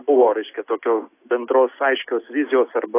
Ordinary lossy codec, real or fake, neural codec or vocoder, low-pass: AAC, 24 kbps; real; none; 3.6 kHz